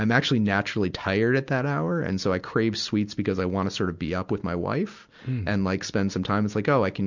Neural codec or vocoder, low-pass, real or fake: none; 7.2 kHz; real